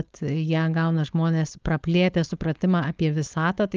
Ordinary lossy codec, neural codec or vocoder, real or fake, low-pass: Opus, 24 kbps; codec, 16 kHz, 4.8 kbps, FACodec; fake; 7.2 kHz